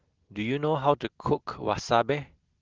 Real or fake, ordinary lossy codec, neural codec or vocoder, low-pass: real; Opus, 16 kbps; none; 7.2 kHz